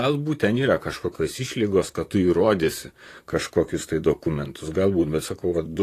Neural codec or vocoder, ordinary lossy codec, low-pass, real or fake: vocoder, 44.1 kHz, 128 mel bands, Pupu-Vocoder; AAC, 48 kbps; 14.4 kHz; fake